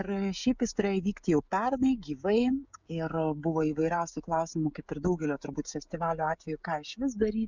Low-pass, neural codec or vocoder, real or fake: 7.2 kHz; codec, 16 kHz, 16 kbps, FreqCodec, smaller model; fake